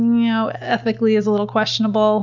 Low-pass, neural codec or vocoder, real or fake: 7.2 kHz; none; real